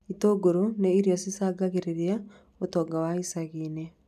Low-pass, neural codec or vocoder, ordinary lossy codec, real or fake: 14.4 kHz; none; none; real